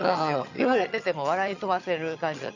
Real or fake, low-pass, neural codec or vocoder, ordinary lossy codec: fake; 7.2 kHz; vocoder, 22.05 kHz, 80 mel bands, HiFi-GAN; none